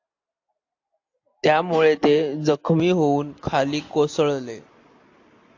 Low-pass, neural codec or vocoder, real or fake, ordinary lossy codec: 7.2 kHz; none; real; AAC, 48 kbps